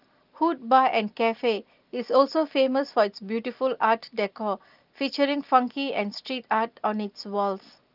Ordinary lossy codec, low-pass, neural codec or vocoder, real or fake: Opus, 24 kbps; 5.4 kHz; none; real